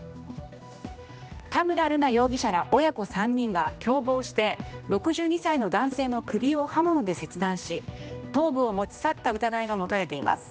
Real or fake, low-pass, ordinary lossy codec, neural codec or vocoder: fake; none; none; codec, 16 kHz, 1 kbps, X-Codec, HuBERT features, trained on general audio